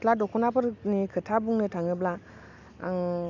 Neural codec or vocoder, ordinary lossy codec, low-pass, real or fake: none; none; 7.2 kHz; real